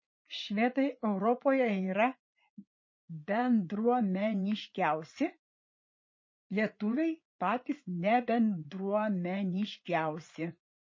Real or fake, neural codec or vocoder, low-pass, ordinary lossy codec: fake; vocoder, 44.1 kHz, 80 mel bands, Vocos; 7.2 kHz; MP3, 32 kbps